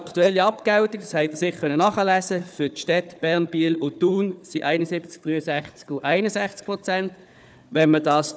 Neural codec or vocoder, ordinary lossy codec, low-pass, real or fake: codec, 16 kHz, 4 kbps, FunCodec, trained on Chinese and English, 50 frames a second; none; none; fake